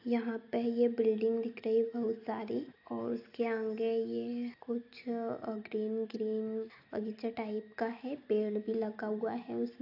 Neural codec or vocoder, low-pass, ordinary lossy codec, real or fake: none; 5.4 kHz; AAC, 32 kbps; real